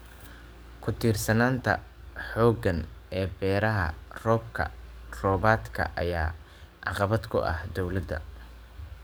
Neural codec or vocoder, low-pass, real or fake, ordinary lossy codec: codec, 44.1 kHz, 7.8 kbps, DAC; none; fake; none